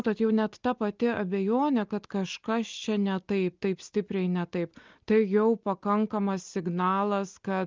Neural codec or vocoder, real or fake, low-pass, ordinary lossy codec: none; real; 7.2 kHz; Opus, 32 kbps